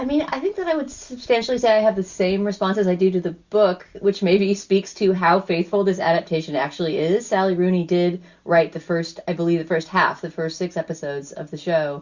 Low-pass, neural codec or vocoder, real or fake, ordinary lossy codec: 7.2 kHz; none; real; Opus, 64 kbps